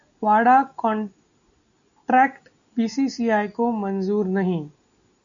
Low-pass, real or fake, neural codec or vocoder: 7.2 kHz; real; none